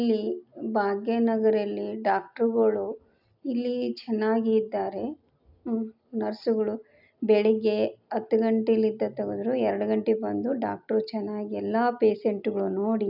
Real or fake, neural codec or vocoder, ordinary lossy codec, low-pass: real; none; none; 5.4 kHz